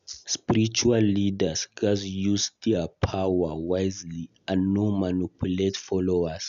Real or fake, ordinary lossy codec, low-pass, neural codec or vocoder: real; none; 7.2 kHz; none